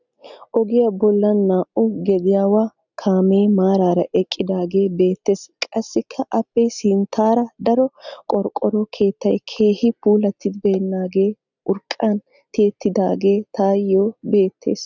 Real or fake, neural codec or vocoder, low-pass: real; none; 7.2 kHz